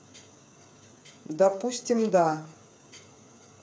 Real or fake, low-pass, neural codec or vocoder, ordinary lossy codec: fake; none; codec, 16 kHz, 8 kbps, FreqCodec, smaller model; none